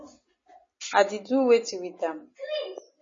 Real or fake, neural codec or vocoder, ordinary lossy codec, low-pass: real; none; MP3, 32 kbps; 7.2 kHz